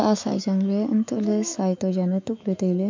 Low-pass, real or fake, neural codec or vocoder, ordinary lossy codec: 7.2 kHz; fake; autoencoder, 48 kHz, 128 numbers a frame, DAC-VAE, trained on Japanese speech; MP3, 48 kbps